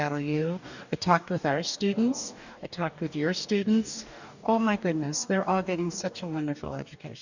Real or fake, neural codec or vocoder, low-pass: fake; codec, 44.1 kHz, 2.6 kbps, DAC; 7.2 kHz